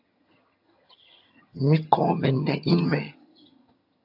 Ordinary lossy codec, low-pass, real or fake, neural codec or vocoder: AAC, 48 kbps; 5.4 kHz; fake; vocoder, 22.05 kHz, 80 mel bands, HiFi-GAN